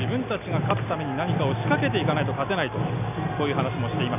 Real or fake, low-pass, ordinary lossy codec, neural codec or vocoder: real; 3.6 kHz; none; none